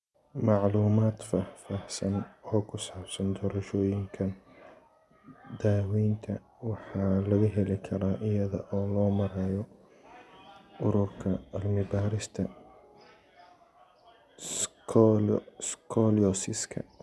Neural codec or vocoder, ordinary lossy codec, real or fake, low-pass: none; none; real; none